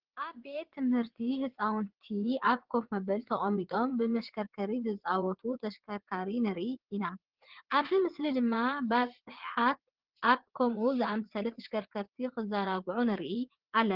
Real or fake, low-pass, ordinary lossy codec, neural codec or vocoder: fake; 5.4 kHz; Opus, 16 kbps; vocoder, 22.05 kHz, 80 mel bands, WaveNeXt